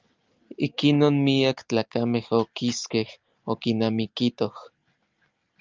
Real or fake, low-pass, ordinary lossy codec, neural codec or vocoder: real; 7.2 kHz; Opus, 24 kbps; none